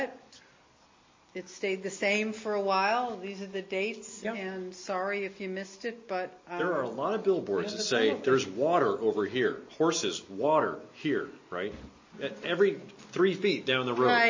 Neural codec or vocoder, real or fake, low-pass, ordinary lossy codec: none; real; 7.2 kHz; MP3, 32 kbps